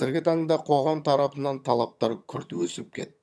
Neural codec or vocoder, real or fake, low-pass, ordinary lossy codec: vocoder, 22.05 kHz, 80 mel bands, HiFi-GAN; fake; none; none